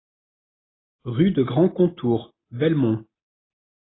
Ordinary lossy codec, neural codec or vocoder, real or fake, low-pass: AAC, 16 kbps; none; real; 7.2 kHz